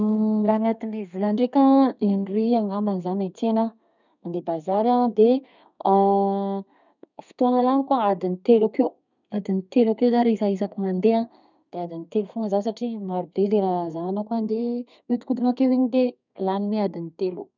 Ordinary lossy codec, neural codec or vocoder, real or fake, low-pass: none; codec, 32 kHz, 1.9 kbps, SNAC; fake; 7.2 kHz